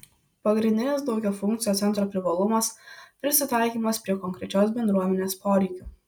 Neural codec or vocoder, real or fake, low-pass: none; real; 19.8 kHz